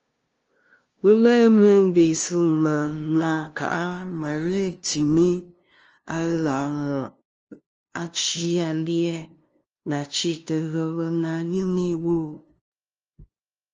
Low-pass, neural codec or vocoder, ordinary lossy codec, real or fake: 7.2 kHz; codec, 16 kHz, 0.5 kbps, FunCodec, trained on LibriTTS, 25 frames a second; Opus, 24 kbps; fake